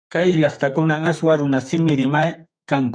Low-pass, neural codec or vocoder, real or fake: 9.9 kHz; codec, 32 kHz, 1.9 kbps, SNAC; fake